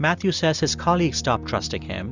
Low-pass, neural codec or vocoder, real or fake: 7.2 kHz; none; real